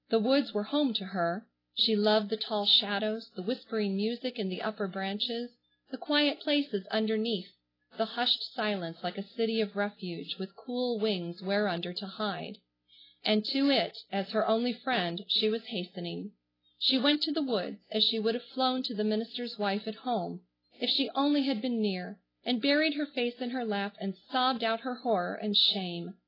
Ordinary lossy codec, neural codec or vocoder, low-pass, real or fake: AAC, 24 kbps; none; 5.4 kHz; real